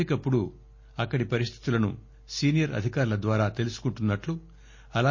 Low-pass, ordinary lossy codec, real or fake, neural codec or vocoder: 7.2 kHz; MP3, 32 kbps; real; none